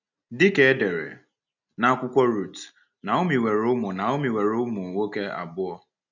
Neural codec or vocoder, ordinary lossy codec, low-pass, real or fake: none; none; 7.2 kHz; real